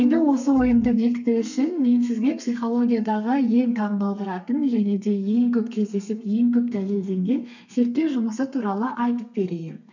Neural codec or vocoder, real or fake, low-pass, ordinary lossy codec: codec, 32 kHz, 1.9 kbps, SNAC; fake; 7.2 kHz; none